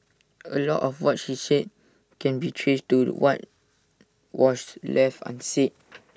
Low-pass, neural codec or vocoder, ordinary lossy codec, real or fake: none; none; none; real